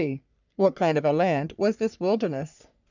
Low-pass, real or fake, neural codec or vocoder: 7.2 kHz; fake; codec, 44.1 kHz, 3.4 kbps, Pupu-Codec